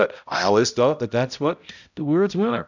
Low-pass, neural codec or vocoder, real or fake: 7.2 kHz; codec, 16 kHz, 0.5 kbps, X-Codec, HuBERT features, trained on balanced general audio; fake